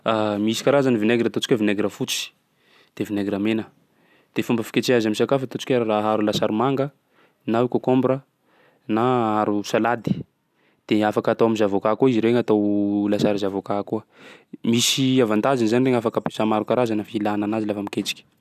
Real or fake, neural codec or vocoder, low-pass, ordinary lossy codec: real; none; 14.4 kHz; none